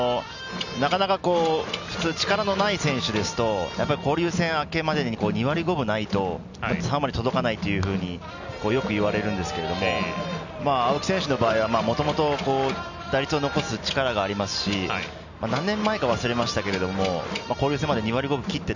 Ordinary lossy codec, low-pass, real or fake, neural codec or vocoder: none; 7.2 kHz; real; none